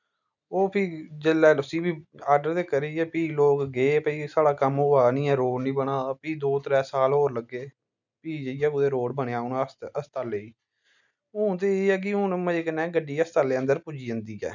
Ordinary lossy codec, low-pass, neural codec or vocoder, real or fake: none; 7.2 kHz; none; real